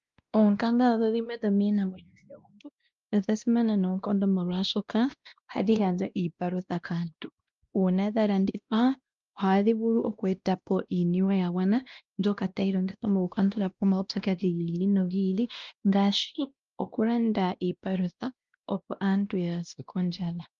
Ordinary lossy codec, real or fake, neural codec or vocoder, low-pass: Opus, 32 kbps; fake; codec, 16 kHz, 1 kbps, X-Codec, WavLM features, trained on Multilingual LibriSpeech; 7.2 kHz